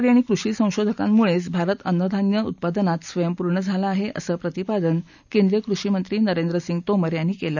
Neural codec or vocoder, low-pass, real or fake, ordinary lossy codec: none; 7.2 kHz; real; none